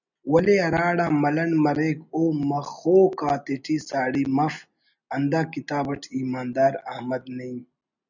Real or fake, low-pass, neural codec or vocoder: real; 7.2 kHz; none